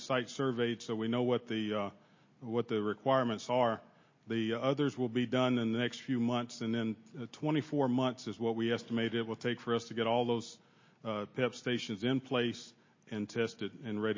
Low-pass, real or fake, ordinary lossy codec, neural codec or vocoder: 7.2 kHz; real; MP3, 32 kbps; none